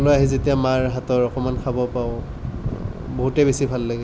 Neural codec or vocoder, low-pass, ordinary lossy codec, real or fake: none; none; none; real